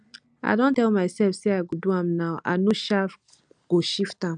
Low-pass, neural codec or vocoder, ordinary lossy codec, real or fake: 9.9 kHz; none; none; real